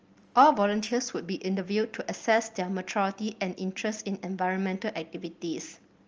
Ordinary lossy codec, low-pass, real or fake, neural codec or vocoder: Opus, 24 kbps; 7.2 kHz; real; none